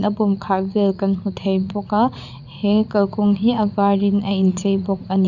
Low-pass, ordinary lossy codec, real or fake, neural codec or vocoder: 7.2 kHz; none; fake; vocoder, 44.1 kHz, 80 mel bands, Vocos